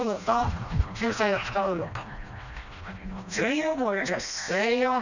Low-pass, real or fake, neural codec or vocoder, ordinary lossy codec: 7.2 kHz; fake; codec, 16 kHz, 1 kbps, FreqCodec, smaller model; none